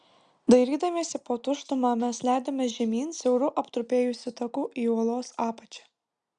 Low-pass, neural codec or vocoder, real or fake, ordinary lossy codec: 9.9 kHz; none; real; Opus, 64 kbps